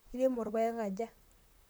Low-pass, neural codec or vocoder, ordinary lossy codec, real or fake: none; vocoder, 44.1 kHz, 128 mel bands, Pupu-Vocoder; none; fake